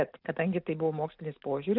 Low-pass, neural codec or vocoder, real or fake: 5.4 kHz; none; real